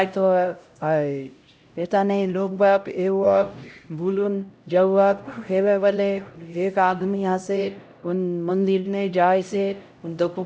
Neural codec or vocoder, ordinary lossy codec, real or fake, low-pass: codec, 16 kHz, 0.5 kbps, X-Codec, HuBERT features, trained on LibriSpeech; none; fake; none